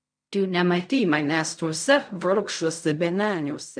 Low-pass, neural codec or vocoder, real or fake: 9.9 kHz; codec, 16 kHz in and 24 kHz out, 0.4 kbps, LongCat-Audio-Codec, fine tuned four codebook decoder; fake